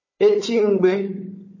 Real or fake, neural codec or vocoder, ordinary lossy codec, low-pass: fake; codec, 16 kHz, 4 kbps, FunCodec, trained on Chinese and English, 50 frames a second; MP3, 32 kbps; 7.2 kHz